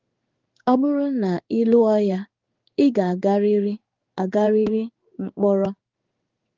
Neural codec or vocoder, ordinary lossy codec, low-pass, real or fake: codec, 16 kHz in and 24 kHz out, 1 kbps, XY-Tokenizer; Opus, 32 kbps; 7.2 kHz; fake